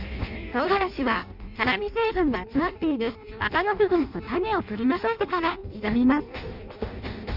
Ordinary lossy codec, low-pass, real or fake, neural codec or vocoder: none; 5.4 kHz; fake; codec, 16 kHz in and 24 kHz out, 0.6 kbps, FireRedTTS-2 codec